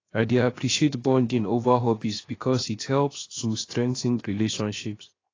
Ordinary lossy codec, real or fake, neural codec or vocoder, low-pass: AAC, 32 kbps; fake; codec, 16 kHz, 0.7 kbps, FocalCodec; 7.2 kHz